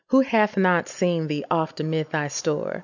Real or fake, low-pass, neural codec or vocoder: real; 7.2 kHz; none